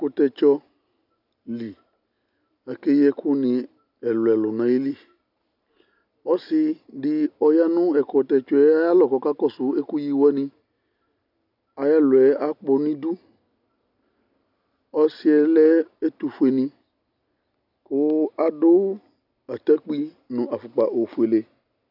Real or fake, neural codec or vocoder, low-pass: real; none; 5.4 kHz